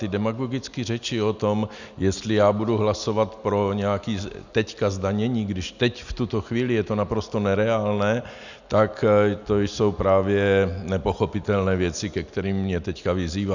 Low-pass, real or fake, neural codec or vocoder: 7.2 kHz; real; none